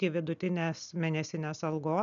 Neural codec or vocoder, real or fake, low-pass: none; real; 7.2 kHz